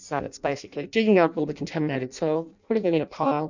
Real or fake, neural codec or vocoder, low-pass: fake; codec, 16 kHz in and 24 kHz out, 0.6 kbps, FireRedTTS-2 codec; 7.2 kHz